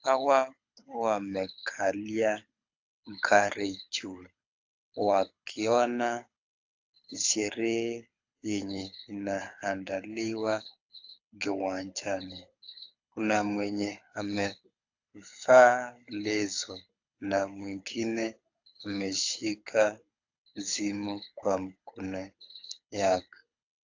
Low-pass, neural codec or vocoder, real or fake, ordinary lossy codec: 7.2 kHz; codec, 24 kHz, 6 kbps, HILCodec; fake; AAC, 48 kbps